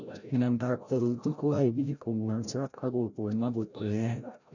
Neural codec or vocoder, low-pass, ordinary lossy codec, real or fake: codec, 16 kHz, 0.5 kbps, FreqCodec, larger model; 7.2 kHz; none; fake